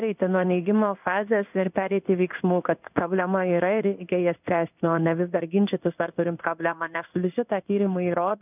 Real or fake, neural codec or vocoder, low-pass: fake; codec, 16 kHz in and 24 kHz out, 1 kbps, XY-Tokenizer; 3.6 kHz